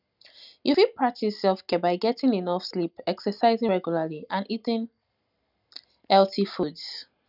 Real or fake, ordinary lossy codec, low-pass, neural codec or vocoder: real; none; 5.4 kHz; none